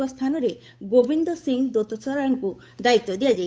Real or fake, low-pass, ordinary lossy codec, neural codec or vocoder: fake; none; none; codec, 16 kHz, 8 kbps, FunCodec, trained on Chinese and English, 25 frames a second